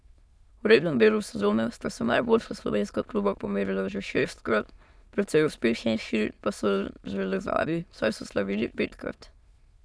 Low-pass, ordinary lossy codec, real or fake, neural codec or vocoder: none; none; fake; autoencoder, 22.05 kHz, a latent of 192 numbers a frame, VITS, trained on many speakers